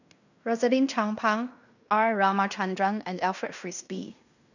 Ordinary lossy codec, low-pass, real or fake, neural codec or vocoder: none; 7.2 kHz; fake; codec, 16 kHz in and 24 kHz out, 0.9 kbps, LongCat-Audio-Codec, fine tuned four codebook decoder